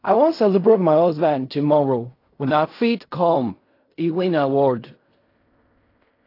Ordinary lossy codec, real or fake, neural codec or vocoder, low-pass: AAC, 32 kbps; fake; codec, 16 kHz in and 24 kHz out, 0.4 kbps, LongCat-Audio-Codec, fine tuned four codebook decoder; 5.4 kHz